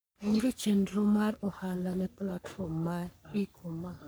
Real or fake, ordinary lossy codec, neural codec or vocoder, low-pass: fake; none; codec, 44.1 kHz, 3.4 kbps, Pupu-Codec; none